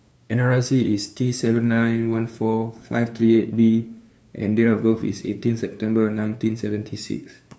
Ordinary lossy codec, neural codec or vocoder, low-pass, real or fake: none; codec, 16 kHz, 2 kbps, FunCodec, trained on LibriTTS, 25 frames a second; none; fake